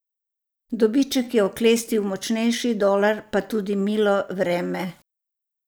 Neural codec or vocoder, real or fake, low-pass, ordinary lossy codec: vocoder, 44.1 kHz, 128 mel bands every 256 samples, BigVGAN v2; fake; none; none